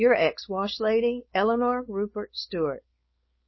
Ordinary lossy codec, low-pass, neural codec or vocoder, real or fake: MP3, 24 kbps; 7.2 kHz; codec, 16 kHz, 4.8 kbps, FACodec; fake